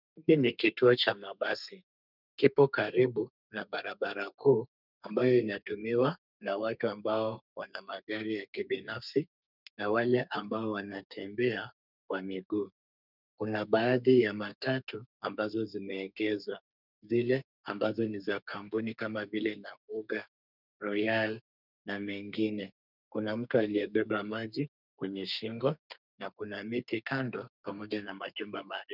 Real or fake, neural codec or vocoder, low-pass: fake; codec, 32 kHz, 1.9 kbps, SNAC; 5.4 kHz